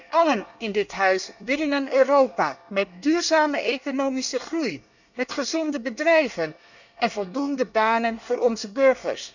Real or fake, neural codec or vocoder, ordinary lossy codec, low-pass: fake; codec, 24 kHz, 1 kbps, SNAC; none; 7.2 kHz